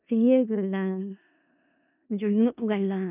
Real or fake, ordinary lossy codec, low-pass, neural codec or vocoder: fake; none; 3.6 kHz; codec, 16 kHz in and 24 kHz out, 0.4 kbps, LongCat-Audio-Codec, four codebook decoder